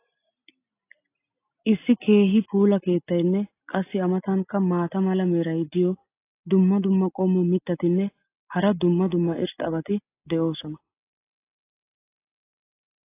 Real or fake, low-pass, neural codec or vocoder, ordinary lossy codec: real; 3.6 kHz; none; AAC, 24 kbps